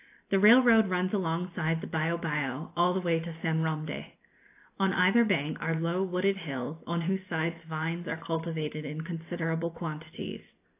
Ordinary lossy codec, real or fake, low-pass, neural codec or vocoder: AAC, 24 kbps; real; 3.6 kHz; none